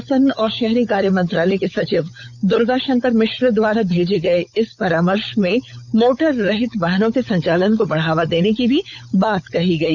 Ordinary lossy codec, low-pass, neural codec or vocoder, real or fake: none; 7.2 kHz; codec, 16 kHz, 16 kbps, FunCodec, trained on LibriTTS, 50 frames a second; fake